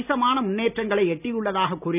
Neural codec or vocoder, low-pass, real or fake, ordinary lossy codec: none; 3.6 kHz; real; none